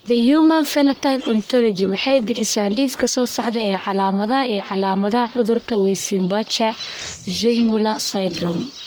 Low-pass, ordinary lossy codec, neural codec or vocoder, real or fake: none; none; codec, 44.1 kHz, 1.7 kbps, Pupu-Codec; fake